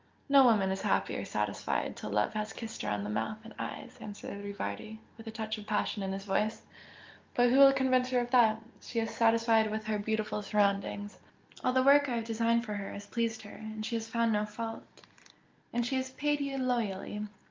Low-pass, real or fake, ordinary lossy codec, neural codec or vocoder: 7.2 kHz; real; Opus, 24 kbps; none